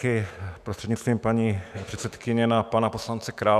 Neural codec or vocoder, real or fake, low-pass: autoencoder, 48 kHz, 128 numbers a frame, DAC-VAE, trained on Japanese speech; fake; 14.4 kHz